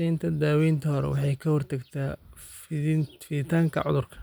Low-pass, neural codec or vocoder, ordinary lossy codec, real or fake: none; none; none; real